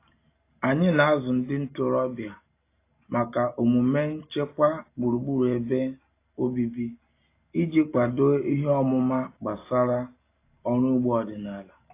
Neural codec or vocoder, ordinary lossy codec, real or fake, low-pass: none; AAC, 24 kbps; real; 3.6 kHz